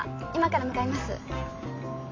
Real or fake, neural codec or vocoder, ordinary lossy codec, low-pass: real; none; AAC, 32 kbps; 7.2 kHz